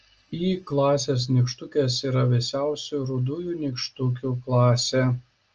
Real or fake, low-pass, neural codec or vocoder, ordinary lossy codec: real; 7.2 kHz; none; Opus, 24 kbps